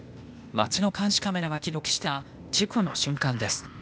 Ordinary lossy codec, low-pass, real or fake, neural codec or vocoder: none; none; fake; codec, 16 kHz, 0.8 kbps, ZipCodec